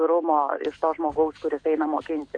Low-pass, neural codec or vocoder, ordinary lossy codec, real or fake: 9.9 kHz; none; MP3, 48 kbps; real